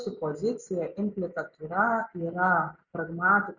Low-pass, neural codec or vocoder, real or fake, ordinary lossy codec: 7.2 kHz; none; real; Opus, 64 kbps